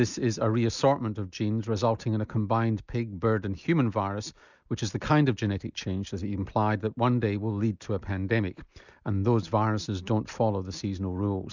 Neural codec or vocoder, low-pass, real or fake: none; 7.2 kHz; real